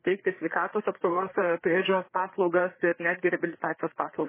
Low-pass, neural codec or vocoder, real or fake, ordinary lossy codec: 3.6 kHz; codec, 16 kHz, 4 kbps, FreqCodec, larger model; fake; MP3, 16 kbps